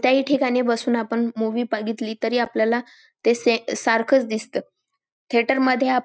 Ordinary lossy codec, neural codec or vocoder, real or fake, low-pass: none; none; real; none